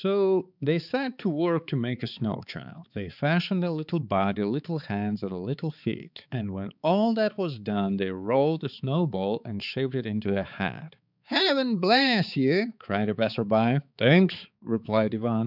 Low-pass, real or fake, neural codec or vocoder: 5.4 kHz; fake; codec, 16 kHz, 4 kbps, X-Codec, HuBERT features, trained on balanced general audio